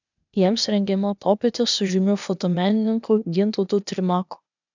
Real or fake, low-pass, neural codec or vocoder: fake; 7.2 kHz; codec, 16 kHz, 0.8 kbps, ZipCodec